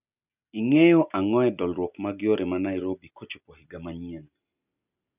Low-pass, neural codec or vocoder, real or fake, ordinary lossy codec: 3.6 kHz; none; real; none